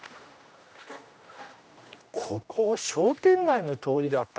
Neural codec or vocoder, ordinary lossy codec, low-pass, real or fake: codec, 16 kHz, 1 kbps, X-Codec, HuBERT features, trained on general audio; none; none; fake